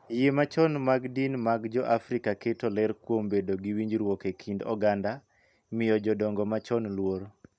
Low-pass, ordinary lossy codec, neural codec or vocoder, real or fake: none; none; none; real